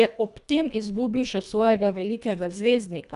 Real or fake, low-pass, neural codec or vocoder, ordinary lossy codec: fake; 10.8 kHz; codec, 24 kHz, 1.5 kbps, HILCodec; none